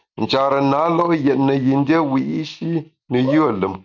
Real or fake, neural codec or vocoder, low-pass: real; none; 7.2 kHz